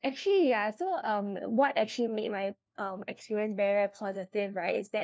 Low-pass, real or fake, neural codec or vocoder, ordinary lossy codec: none; fake; codec, 16 kHz, 1 kbps, FunCodec, trained on LibriTTS, 50 frames a second; none